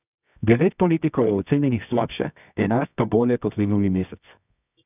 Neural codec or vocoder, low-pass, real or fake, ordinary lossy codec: codec, 24 kHz, 0.9 kbps, WavTokenizer, medium music audio release; 3.6 kHz; fake; none